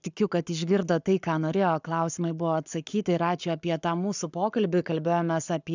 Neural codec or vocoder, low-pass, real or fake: codec, 44.1 kHz, 7.8 kbps, Pupu-Codec; 7.2 kHz; fake